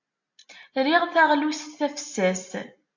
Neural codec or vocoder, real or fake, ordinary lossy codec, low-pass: none; real; MP3, 48 kbps; 7.2 kHz